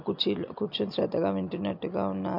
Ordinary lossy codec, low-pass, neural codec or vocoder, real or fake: MP3, 48 kbps; 5.4 kHz; none; real